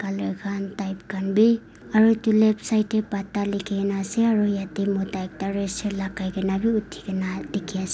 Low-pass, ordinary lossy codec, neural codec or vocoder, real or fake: none; none; none; real